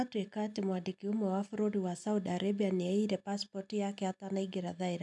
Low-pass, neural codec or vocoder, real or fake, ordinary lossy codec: 10.8 kHz; none; real; none